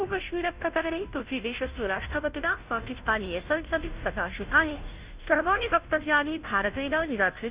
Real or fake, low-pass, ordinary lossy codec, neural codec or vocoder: fake; 3.6 kHz; Opus, 64 kbps; codec, 16 kHz, 0.5 kbps, FunCodec, trained on Chinese and English, 25 frames a second